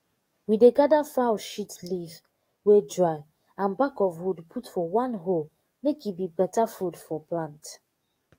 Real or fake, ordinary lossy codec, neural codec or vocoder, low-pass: fake; AAC, 48 kbps; codec, 44.1 kHz, 7.8 kbps, DAC; 19.8 kHz